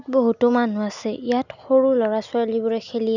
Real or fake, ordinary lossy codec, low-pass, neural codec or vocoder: real; none; 7.2 kHz; none